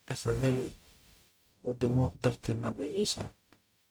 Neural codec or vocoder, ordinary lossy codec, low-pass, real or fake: codec, 44.1 kHz, 0.9 kbps, DAC; none; none; fake